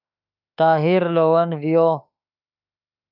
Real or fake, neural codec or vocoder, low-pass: fake; autoencoder, 48 kHz, 32 numbers a frame, DAC-VAE, trained on Japanese speech; 5.4 kHz